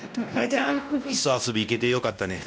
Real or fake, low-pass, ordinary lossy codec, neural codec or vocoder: fake; none; none; codec, 16 kHz, 1 kbps, X-Codec, WavLM features, trained on Multilingual LibriSpeech